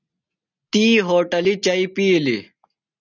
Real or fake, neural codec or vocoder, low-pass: real; none; 7.2 kHz